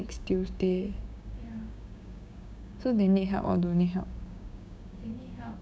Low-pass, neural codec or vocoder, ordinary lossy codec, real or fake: none; codec, 16 kHz, 6 kbps, DAC; none; fake